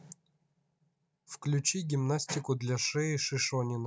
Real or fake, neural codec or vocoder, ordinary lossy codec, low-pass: real; none; none; none